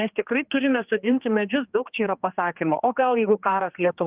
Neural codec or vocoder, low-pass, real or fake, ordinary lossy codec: codec, 16 kHz, 2 kbps, X-Codec, HuBERT features, trained on general audio; 3.6 kHz; fake; Opus, 32 kbps